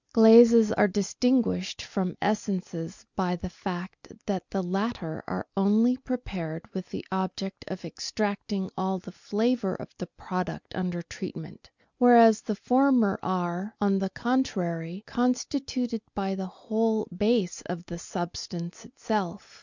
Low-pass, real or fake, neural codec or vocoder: 7.2 kHz; real; none